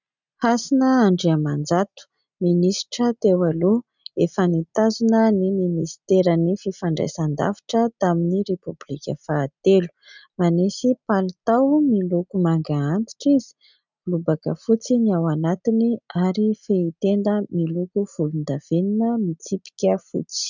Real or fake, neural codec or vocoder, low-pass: real; none; 7.2 kHz